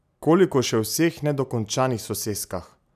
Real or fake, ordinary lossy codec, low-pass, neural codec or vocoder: real; none; 14.4 kHz; none